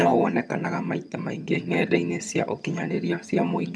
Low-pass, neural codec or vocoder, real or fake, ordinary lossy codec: none; vocoder, 22.05 kHz, 80 mel bands, HiFi-GAN; fake; none